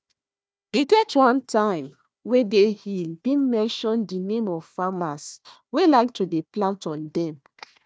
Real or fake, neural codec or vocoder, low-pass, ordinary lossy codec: fake; codec, 16 kHz, 1 kbps, FunCodec, trained on Chinese and English, 50 frames a second; none; none